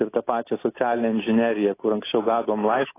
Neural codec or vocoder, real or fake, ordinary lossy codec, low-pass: none; real; AAC, 16 kbps; 3.6 kHz